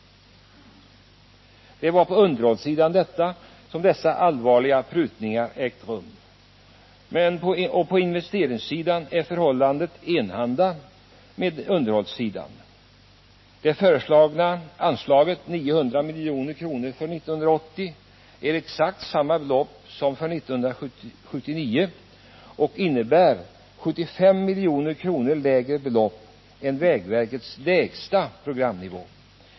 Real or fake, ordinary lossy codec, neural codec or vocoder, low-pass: real; MP3, 24 kbps; none; 7.2 kHz